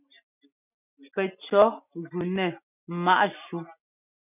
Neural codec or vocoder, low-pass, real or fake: none; 3.6 kHz; real